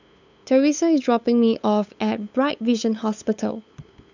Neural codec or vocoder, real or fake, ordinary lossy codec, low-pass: codec, 16 kHz, 8 kbps, FunCodec, trained on LibriTTS, 25 frames a second; fake; none; 7.2 kHz